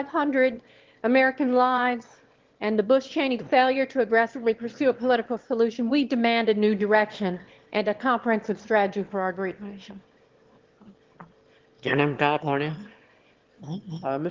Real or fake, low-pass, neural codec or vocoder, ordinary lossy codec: fake; 7.2 kHz; autoencoder, 22.05 kHz, a latent of 192 numbers a frame, VITS, trained on one speaker; Opus, 16 kbps